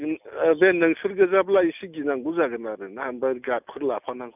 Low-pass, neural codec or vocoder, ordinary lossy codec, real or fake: 3.6 kHz; none; none; real